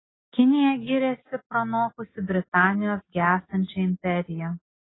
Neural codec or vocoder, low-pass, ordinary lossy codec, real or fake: none; 7.2 kHz; AAC, 16 kbps; real